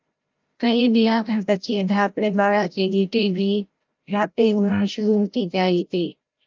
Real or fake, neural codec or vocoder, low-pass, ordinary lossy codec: fake; codec, 16 kHz, 0.5 kbps, FreqCodec, larger model; 7.2 kHz; Opus, 24 kbps